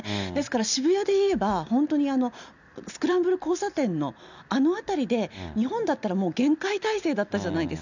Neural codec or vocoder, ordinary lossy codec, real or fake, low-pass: none; none; real; 7.2 kHz